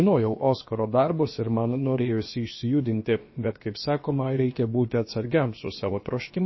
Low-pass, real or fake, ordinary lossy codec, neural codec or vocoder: 7.2 kHz; fake; MP3, 24 kbps; codec, 16 kHz, about 1 kbps, DyCAST, with the encoder's durations